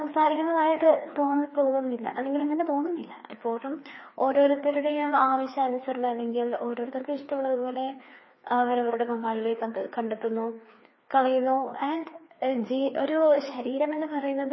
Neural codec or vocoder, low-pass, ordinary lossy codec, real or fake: codec, 16 kHz, 2 kbps, FreqCodec, larger model; 7.2 kHz; MP3, 24 kbps; fake